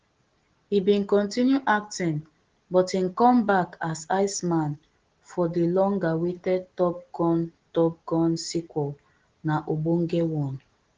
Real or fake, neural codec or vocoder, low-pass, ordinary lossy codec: real; none; 7.2 kHz; Opus, 16 kbps